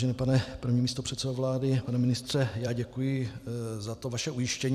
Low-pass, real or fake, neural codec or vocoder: 14.4 kHz; fake; vocoder, 44.1 kHz, 128 mel bands every 256 samples, BigVGAN v2